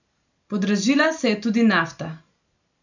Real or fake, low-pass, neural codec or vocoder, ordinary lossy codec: real; 7.2 kHz; none; none